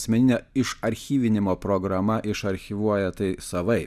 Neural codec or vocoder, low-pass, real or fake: none; 14.4 kHz; real